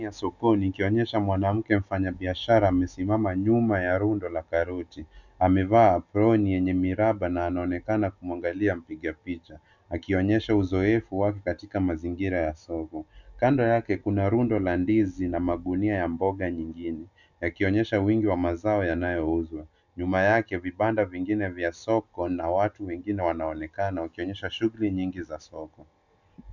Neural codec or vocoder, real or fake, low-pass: none; real; 7.2 kHz